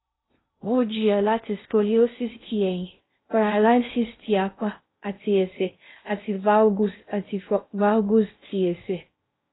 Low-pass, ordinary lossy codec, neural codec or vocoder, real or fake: 7.2 kHz; AAC, 16 kbps; codec, 16 kHz in and 24 kHz out, 0.6 kbps, FocalCodec, streaming, 2048 codes; fake